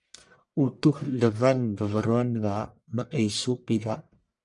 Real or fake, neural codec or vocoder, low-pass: fake; codec, 44.1 kHz, 1.7 kbps, Pupu-Codec; 10.8 kHz